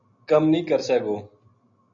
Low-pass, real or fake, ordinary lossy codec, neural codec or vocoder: 7.2 kHz; real; MP3, 96 kbps; none